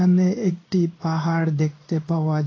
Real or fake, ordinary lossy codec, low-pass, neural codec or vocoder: fake; AAC, 32 kbps; 7.2 kHz; codec, 16 kHz in and 24 kHz out, 1 kbps, XY-Tokenizer